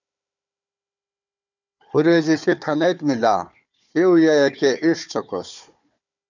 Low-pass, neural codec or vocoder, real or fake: 7.2 kHz; codec, 16 kHz, 4 kbps, FunCodec, trained on Chinese and English, 50 frames a second; fake